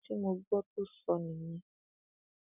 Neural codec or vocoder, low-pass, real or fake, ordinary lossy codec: none; 3.6 kHz; real; none